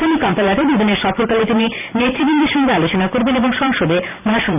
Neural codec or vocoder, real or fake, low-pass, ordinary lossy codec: vocoder, 44.1 kHz, 128 mel bands every 512 samples, BigVGAN v2; fake; 3.6 kHz; none